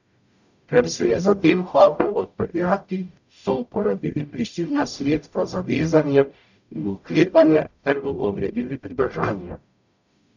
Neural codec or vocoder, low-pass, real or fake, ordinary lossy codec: codec, 44.1 kHz, 0.9 kbps, DAC; 7.2 kHz; fake; none